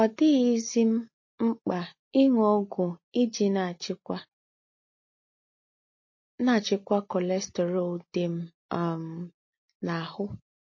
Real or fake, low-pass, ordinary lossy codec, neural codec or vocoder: real; 7.2 kHz; MP3, 32 kbps; none